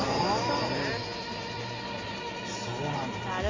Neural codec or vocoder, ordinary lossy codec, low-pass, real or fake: none; AAC, 32 kbps; 7.2 kHz; real